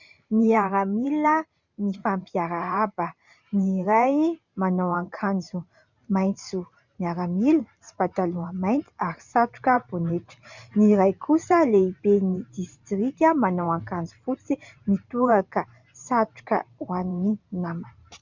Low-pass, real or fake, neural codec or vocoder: 7.2 kHz; fake; vocoder, 44.1 kHz, 128 mel bands every 512 samples, BigVGAN v2